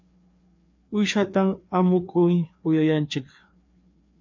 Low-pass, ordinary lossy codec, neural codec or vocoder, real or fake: 7.2 kHz; MP3, 48 kbps; codec, 16 kHz, 2 kbps, FreqCodec, larger model; fake